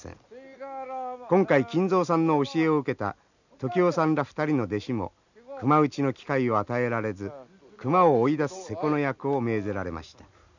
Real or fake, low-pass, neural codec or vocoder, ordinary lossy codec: real; 7.2 kHz; none; none